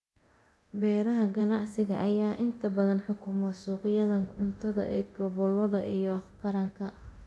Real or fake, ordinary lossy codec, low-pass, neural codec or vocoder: fake; none; none; codec, 24 kHz, 0.9 kbps, DualCodec